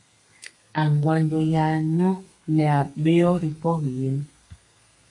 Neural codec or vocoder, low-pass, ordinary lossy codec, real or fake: codec, 32 kHz, 1.9 kbps, SNAC; 10.8 kHz; MP3, 64 kbps; fake